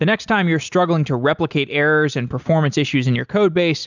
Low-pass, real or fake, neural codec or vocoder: 7.2 kHz; real; none